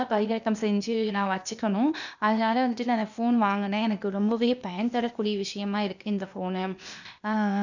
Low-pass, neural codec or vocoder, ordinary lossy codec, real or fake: 7.2 kHz; codec, 16 kHz, 0.8 kbps, ZipCodec; none; fake